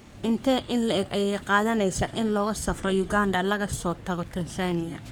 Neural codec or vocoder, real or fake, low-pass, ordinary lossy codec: codec, 44.1 kHz, 3.4 kbps, Pupu-Codec; fake; none; none